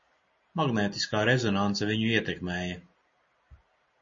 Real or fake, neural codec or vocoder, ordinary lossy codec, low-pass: real; none; MP3, 32 kbps; 7.2 kHz